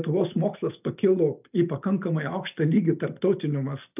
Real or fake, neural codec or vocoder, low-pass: real; none; 3.6 kHz